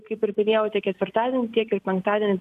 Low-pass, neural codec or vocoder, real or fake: 14.4 kHz; none; real